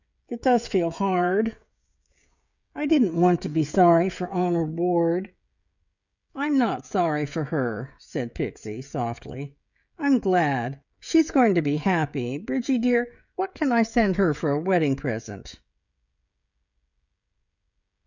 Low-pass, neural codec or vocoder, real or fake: 7.2 kHz; codec, 16 kHz, 16 kbps, FreqCodec, smaller model; fake